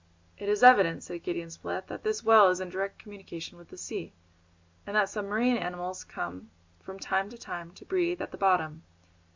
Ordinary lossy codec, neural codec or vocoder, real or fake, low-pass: MP3, 64 kbps; none; real; 7.2 kHz